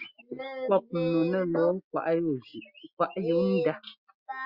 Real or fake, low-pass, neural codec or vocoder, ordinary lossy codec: real; 5.4 kHz; none; Opus, 64 kbps